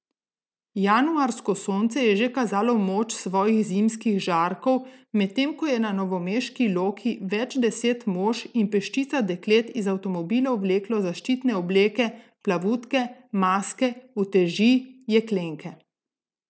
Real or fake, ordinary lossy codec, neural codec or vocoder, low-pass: real; none; none; none